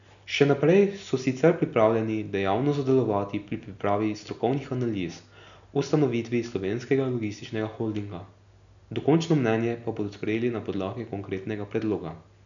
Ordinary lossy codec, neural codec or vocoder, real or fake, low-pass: none; none; real; 7.2 kHz